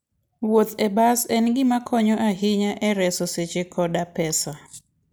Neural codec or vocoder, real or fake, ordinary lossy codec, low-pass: none; real; none; none